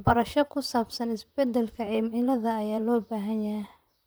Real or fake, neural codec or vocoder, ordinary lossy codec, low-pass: fake; vocoder, 44.1 kHz, 128 mel bands, Pupu-Vocoder; none; none